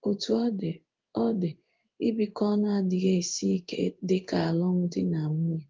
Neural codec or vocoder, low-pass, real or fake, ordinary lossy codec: codec, 16 kHz in and 24 kHz out, 1 kbps, XY-Tokenizer; 7.2 kHz; fake; Opus, 32 kbps